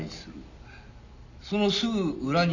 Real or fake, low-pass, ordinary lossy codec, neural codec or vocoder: real; 7.2 kHz; none; none